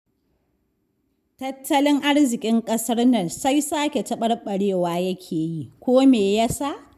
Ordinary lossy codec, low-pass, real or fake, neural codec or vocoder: none; 14.4 kHz; real; none